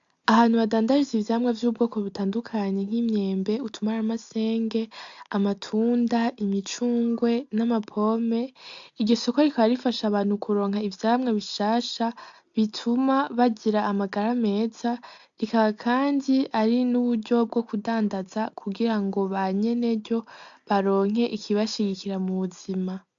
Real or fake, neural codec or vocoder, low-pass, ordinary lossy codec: real; none; 7.2 kHz; AAC, 64 kbps